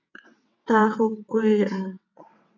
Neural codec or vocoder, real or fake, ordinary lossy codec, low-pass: vocoder, 22.05 kHz, 80 mel bands, WaveNeXt; fake; MP3, 64 kbps; 7.2 kHz